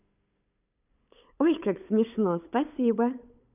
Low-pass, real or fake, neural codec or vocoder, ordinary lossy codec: 3.6 kHz; fake; codec, 16 kHz, 8 kbps, FunCodec, trained on LibriTTS, 25 frames a second; none